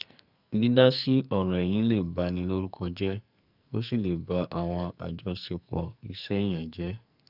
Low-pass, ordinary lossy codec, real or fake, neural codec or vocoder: 5.4 kHz; none; fake; codec, 44.1 kHz, 2.6 kbps, SNAC